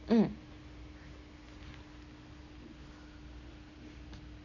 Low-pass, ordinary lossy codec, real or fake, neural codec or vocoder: 7.2 kHz; none; real; none